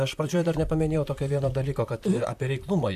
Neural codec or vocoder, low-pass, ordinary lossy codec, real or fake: vocoder, 44.1 kHz, 128 mel bands, Pupu-Vocoder; 14.4 kHz; AAC, 96 kbps; fake